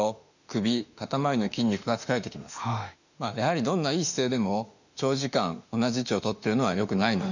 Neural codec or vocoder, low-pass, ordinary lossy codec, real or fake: autoencoder, 48 kHz, 32 numbers a frame, DAC-VAE, trained on Japanese speech; 7.2 kHz; none; fake